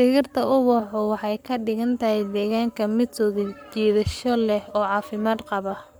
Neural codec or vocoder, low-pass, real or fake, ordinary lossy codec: codec, 44.1 kHz, 7.8 kbps, Pupu-Codec; none; fake; none